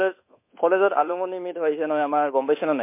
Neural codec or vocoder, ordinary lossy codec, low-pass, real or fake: codec, 24 kHz, 1.2 kbps, DualCodec; MP3, 24 kbps; 3.6 kHz; fake